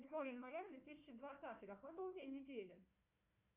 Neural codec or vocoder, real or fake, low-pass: codec, 16 kHz, 1 kbps, FunCodec, trained on Chinese and English, 50 frames a second; fake; 3.6 kHz